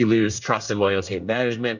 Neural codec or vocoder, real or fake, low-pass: codec, 24 kHz, 1 kbps, SNAC; fake; 7.2 kHz